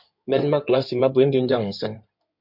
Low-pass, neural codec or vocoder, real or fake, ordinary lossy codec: 5.4 kHz; codec, 16 kHz in and 24 kHz out, 2.2 kbps, FireRedTTS-2 codec; fake; MP3, 48 kbps